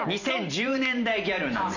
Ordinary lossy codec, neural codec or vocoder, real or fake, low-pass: none; vocoder, 44.1 kHz, 128 mel bands every 512 samples, BigVGAN v2; fake; 7.2 kHz